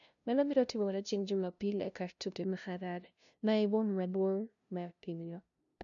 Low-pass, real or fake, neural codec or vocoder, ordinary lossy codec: 7.2 kHz; fake; codec, 16 kHz, 0.5 kbps, FunCodec, trained on LibriTTS, 25 frames a second; none